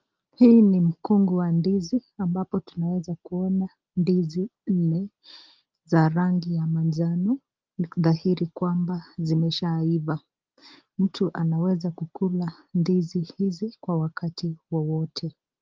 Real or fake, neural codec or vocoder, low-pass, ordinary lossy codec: real; none; 7.2 kHz; Opus, 32 kbps